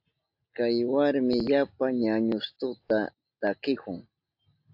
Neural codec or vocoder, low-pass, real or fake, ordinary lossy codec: none; 5.4 kHz; real; AAC, 48 kbps